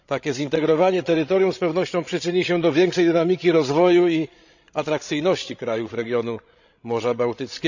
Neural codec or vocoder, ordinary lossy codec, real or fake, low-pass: codec, 16 kHz, 16 kbps, FreqCodec, larger model; none; fake; 7.2 kHz